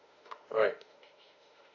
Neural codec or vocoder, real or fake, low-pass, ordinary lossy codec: autoencoder, 48 kHz, 32 numbers a frame, DAC-VAE, trained on Japanese speech; fake; 7.2 kHz; none